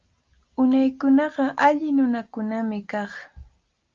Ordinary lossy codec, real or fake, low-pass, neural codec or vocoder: Opus, 24 kbps; real; 7.2 kHz; none